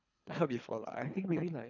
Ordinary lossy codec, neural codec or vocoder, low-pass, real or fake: none; codec, 24 kHz, 3 kbps, HILCodec; 7.2 kHz; fake